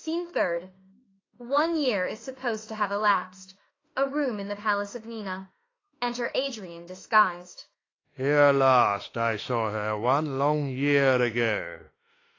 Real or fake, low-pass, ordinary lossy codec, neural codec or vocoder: fake; 7.2 kHz; AAC, 32 kbps; autoencoder, 48 kHz, 32 numbers a frame, DAC-VAE, trained on Japanese speech